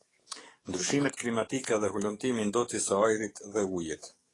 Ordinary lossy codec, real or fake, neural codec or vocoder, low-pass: AAC, 32 kbps; fake; codec, 44.1 kHz, 7.8 kbps, DAC; 10.8 kHz